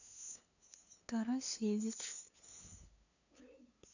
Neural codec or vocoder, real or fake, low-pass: codec, 16 kHz, 2 kbps, FunCodec, trained on LibriTTS, 25 frames a second; fake; 7.2 kHz